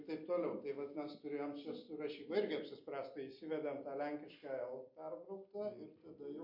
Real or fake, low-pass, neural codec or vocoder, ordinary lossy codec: real; 5.4 kHz; none; MP3, 32 kbps